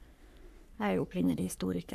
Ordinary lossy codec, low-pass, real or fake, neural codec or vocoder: none; 14.4 kHz; fake; codec, 44.1 kHz, 3.4 kbps, Pupu-Codec